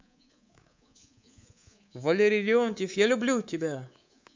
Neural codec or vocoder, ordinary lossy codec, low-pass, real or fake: codec, 24 kHz, 3.1 kbps, DualCodec; MP3, 64 kbps; 7.2 kHz; fake